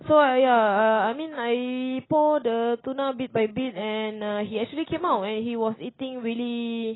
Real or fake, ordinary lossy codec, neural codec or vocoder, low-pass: real; AAC, 16 kbps; none; 7.2 kHz